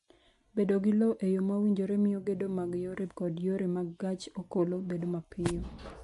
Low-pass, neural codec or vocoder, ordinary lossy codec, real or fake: 14.4 kHz; none; MP3, 48 kbps; real